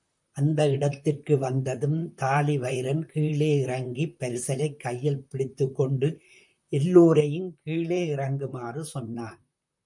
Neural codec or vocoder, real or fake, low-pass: vocoder, 44.1 kHz, 128 mel bands, Pupu-Vocoder; fake; 10.8 kHz